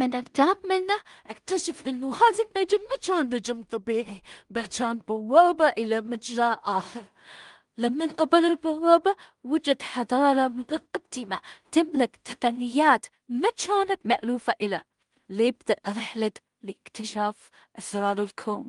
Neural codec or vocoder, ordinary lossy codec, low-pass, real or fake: codec, 16 kHz in and 24 kHz out, 0.4 kbps, LongCat-Audio-Codec, two codebook decoder; Opus, 32 kbps; 10.8 kHz; fake